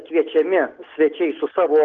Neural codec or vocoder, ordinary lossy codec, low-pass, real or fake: none; Opus, 16 kbps; 7.2 kHz; real